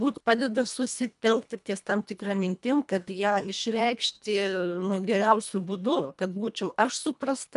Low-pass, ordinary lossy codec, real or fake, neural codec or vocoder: 10.8 kHz; MP3, 96 kbps; fake; codec, 24 kHz, 1.5 kbps, HILCodec